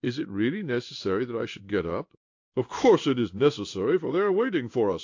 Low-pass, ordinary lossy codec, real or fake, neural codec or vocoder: 7.2 kHz; AAC, 48 kbps; fake; codec, 16 kHz in and 24 kHz out, 1 kbps, XY-Tokenizer